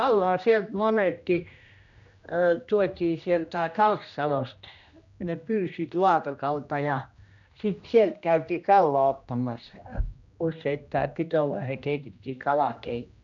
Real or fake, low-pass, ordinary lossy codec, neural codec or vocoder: fake; 7.2 kHz; none; codec, 16 kHz, 1 kbps, X-Codec, HuBERT features, trained on general audio